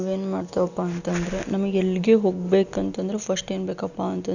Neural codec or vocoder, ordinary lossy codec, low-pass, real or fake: none; none; 7.2 kHz; real